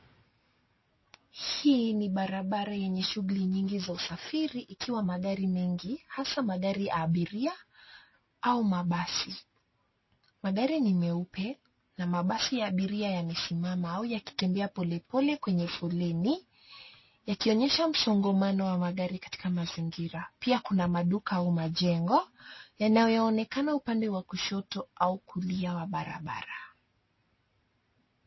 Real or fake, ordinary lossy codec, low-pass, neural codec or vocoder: real; MP3, 24 kbps; 7.2 kHz; none